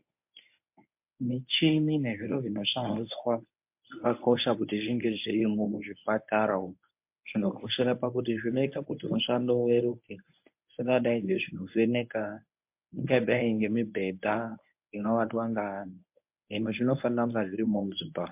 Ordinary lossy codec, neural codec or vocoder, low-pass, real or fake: MP3, 32 kbps; codec, 24 kHz, 0.9 kbps, WavTokenizer, medium speech release version 1; 3.6 kHz; fake